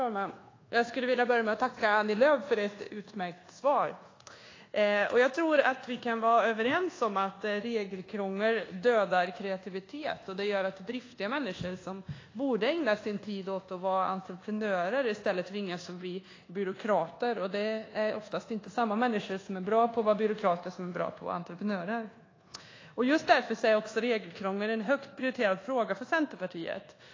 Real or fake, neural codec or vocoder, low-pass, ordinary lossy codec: fake; codec, 24 kHz, 1.2 kbps, DualCodec; 7.2 kHz; AAC, 32 kbps